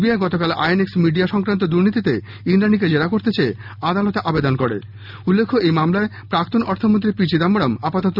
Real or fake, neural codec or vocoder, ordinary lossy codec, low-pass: real; none; none; 5.4 kHz